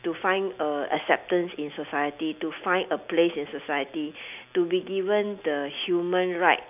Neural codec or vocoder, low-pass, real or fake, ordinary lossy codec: none; 3.6 kHz; real; none